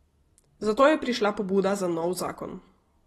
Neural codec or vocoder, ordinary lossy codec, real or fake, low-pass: none; AAC, 32 kbps; real; 19.8 kHz